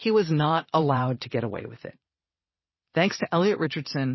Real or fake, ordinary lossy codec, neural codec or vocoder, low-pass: fake; MP3, 24 kbps; vocoder, 22.05 kHz, 80 mel bands, Vocos; 7.2 kHz